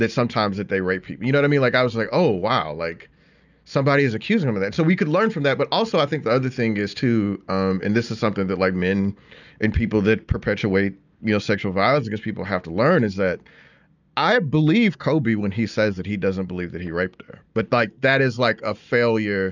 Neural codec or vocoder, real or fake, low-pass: none; real; 7.2 kHz